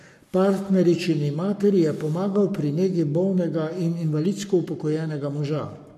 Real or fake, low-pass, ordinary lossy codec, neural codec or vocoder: fake; 14.4 kHz; MP3, 64 kbps; codec, 44.1 kHz, 7.8 kbps, Pupu-Codec